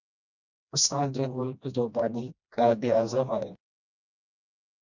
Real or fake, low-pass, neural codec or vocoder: fake; 7.2 kHz; codec, 16 kHz, 1 kbps, FreqCodec, smaller model